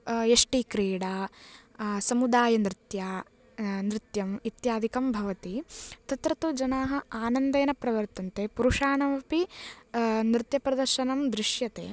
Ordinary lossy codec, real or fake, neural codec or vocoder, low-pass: none; real; none; none